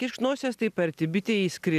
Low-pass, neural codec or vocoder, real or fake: 14.4 kHz; none; real